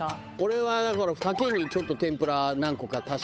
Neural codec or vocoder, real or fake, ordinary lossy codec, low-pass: codec, 16 kHz, 8 kbps, FunCodec, trained on Chinese and English, 25 frames a second; fake; none; none